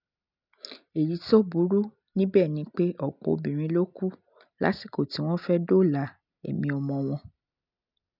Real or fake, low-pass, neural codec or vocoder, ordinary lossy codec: real; 5.4 kHz; none; none